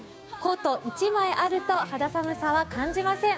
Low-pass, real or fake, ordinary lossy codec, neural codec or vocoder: none; fake; none; codec, 16 kHz, 6 kbps, DAC